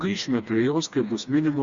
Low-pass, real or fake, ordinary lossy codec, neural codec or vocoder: 7.2 kHz; fake; Opus, 64 kbps; codec, 16 kHz, 2 kbps, FreqCodec, smaller model